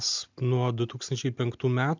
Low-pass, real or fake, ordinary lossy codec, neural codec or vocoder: 7.2 kHz; real; MP3, 64 kbps; none